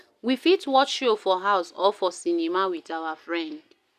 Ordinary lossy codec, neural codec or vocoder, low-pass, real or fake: none; none; 14.4 kHz; real